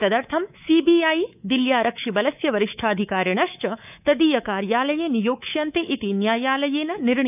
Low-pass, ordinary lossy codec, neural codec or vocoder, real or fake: 3.6 kHz; none; codec, 24 kHz, 3.1 kbps, DualCodec; fake